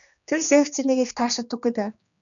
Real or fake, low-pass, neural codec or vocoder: fake; 7.2 kHz; codec, 16 kHz, 2 kbps, X-Codec, HuBERT features, trained on general audio